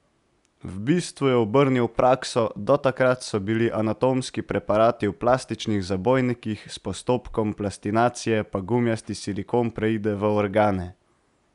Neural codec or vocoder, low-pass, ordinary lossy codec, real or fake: none; 10.8 kHz; none; real